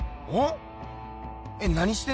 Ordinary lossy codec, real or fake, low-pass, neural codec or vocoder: none; real; none; none